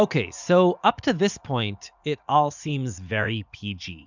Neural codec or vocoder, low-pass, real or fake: vocoder, 44.1 kHz, 80 mel bands, Vocos; 7.2 kHz; fake